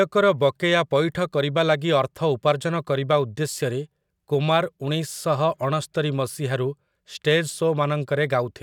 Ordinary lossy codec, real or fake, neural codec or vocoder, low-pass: none; real; none; 19.8 kHz